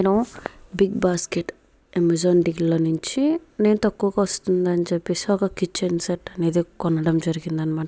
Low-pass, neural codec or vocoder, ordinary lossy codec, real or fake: none; none; none; real